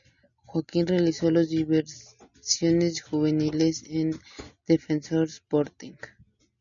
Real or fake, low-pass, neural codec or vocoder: real; 7.2 kHz; none